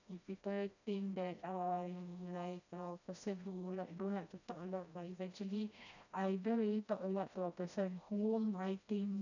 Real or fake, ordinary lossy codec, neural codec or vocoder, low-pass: fake; none; codec, 16 kHz, 1 kbps, FreqCodec, smaller model; 7.2 kHz